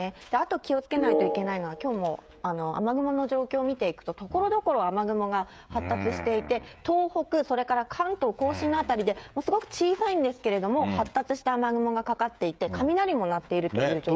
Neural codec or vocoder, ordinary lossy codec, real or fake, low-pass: codec, 16 kHz, 16 kbps, FreqCodec, smaller model; none; fake; none